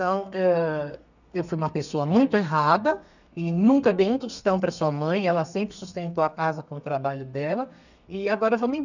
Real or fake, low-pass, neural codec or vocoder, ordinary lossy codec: fake; 7.2 kHz; codec, 32 kHz, 1.9 kbps, SNAC; none